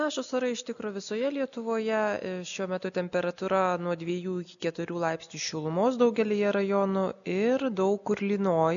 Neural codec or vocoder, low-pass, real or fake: none; 7.2 kHz; real